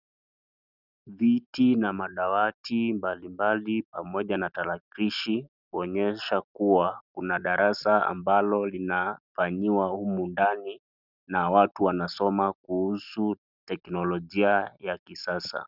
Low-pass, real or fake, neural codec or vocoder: 5.4 kHz; real; none